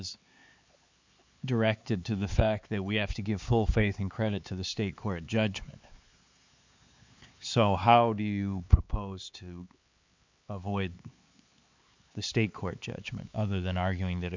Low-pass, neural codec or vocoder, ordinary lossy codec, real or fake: 7.2 kHz; codec, 16 kHz, 4 kbps, X-Codec, HuBERT features, trained on LibriSpeech; MP3, 64 kbps; fake